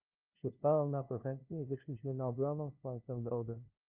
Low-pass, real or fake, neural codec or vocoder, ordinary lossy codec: 3.6 kHz; fake; codec, 16 kHz, 0.5 kbps, FunCodec, trained on LibriTTS, 25 frames a second; Opus, 24 kbps